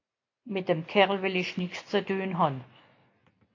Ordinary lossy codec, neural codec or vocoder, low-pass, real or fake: AAC, 48 kbps; none; 7.2 kHz; real